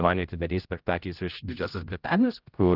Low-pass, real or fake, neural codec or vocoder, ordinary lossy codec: 5.4 kHz; fake; codec, 16 kHz, 0.5 kbps, X-Codec, HuBERT features, trained on general audio; Opus, 32 kbps